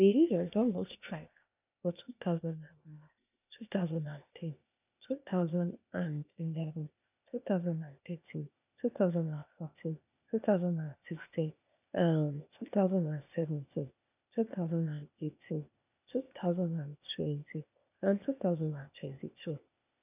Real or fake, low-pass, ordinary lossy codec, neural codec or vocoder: fake; 3.6 kHz; none; codec, 16 kHz, 0.8 kbps, ZipCodec